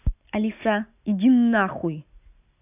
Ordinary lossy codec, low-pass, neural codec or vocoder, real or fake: none; 3.6 kHz; none; real